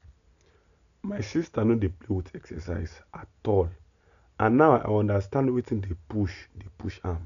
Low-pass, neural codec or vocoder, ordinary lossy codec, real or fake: 7.2 kHz; none; none; real